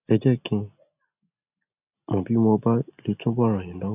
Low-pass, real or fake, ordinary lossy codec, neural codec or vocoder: 3.6 kHz; real; none; none